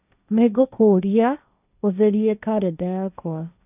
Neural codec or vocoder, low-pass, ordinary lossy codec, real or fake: codec, 16 kHz, 1.1 kbps, Voila-Tokenizer; 3.6 kHz; none; fake